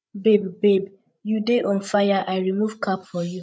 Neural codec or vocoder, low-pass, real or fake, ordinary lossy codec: codec, 16 kHz, 16 kbps, FreqCodec, larger model; none; fake; none